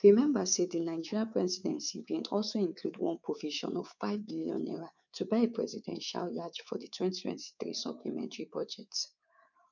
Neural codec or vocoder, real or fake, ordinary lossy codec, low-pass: codec, 24 kHz, 3.1 kbps, DualCodec; fake; none; 7.2 kHz